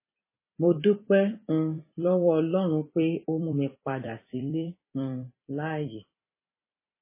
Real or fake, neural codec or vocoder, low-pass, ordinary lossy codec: fake; vocoder, 44.1 kHz, 128 mel bands every 256 samples, BigVGAN v2; 3.6 kHz; MP3, 16 kbps